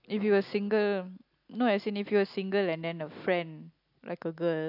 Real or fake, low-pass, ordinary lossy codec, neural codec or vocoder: real; 5.4 kHz; AAC, 48 kbps; none